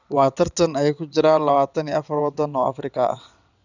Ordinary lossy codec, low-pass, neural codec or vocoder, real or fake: none; 7.2 kHz; vocoder, 22.05 kHz, 80 mel bands, WaveNeXt; fake